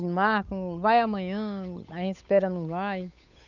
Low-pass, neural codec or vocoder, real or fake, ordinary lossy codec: 7.2 kHz; codec, 16 kHz, 8 kbps, FunCodec, trained on Chinese and English, 25 frames a second; fake; none